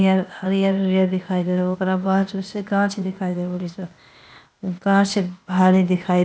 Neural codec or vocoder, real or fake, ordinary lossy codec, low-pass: codec, 16 kHz, 0.8 kbps, ZipCodec; fake; none; none